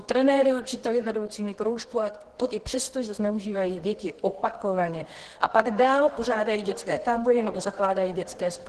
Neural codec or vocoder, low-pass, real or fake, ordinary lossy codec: codec, 24 kHz, 0.9 kbps, WavTokenizer, medium music audio release; 10.8 kHz; fake; Opus, 16 kbps